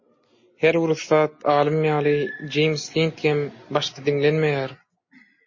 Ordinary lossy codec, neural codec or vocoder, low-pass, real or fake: MP3, 32 kbps; none; 7.2 kHz; real